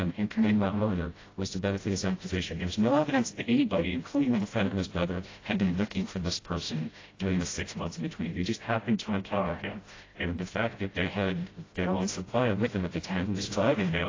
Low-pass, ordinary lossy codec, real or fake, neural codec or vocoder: 7.2 kHz; AAC, 32 kbps; fake; codec, 16 kHz, 0.5 kbps, FreqCodec, smaller model